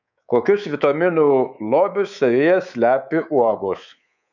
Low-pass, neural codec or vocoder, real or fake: 7.2 kHz; codec, 24 kHz, 3.1 kbps, DualCodec; fake